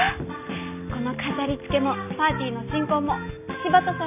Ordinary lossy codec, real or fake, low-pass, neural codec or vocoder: none; real; 3.6 kHz; none